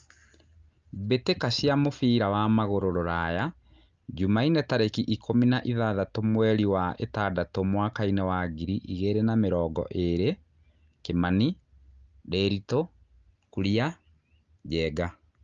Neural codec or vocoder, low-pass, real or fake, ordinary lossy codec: none; 7.2 kHz; real; Opus, 24 kbps